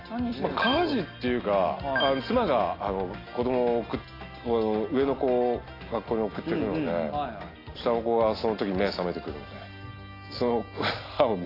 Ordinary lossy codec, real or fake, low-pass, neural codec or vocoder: AAC, 24 kbps; real; 5.4 kHz; none